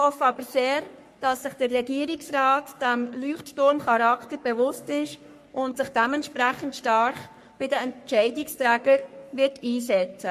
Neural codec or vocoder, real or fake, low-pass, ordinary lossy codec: codec, 44.1 kHz, 3.4 kbps, Pupu-Codec; fake; 14.4 kHz; MP3, 64 kbps